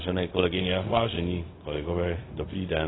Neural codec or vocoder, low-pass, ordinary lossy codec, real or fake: codec, 16 kHz, 0.4 kbps, LongCat-Audio-Codec; 7.2 kHz; AAC, 16 kbps; fake